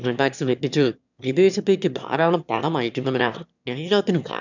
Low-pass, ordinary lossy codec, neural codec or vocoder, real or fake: 7.2 kHz; none; autoencoder, 22.05 kHz, a latent of 192 numbers a frame, VITS, trained on one speaker; fake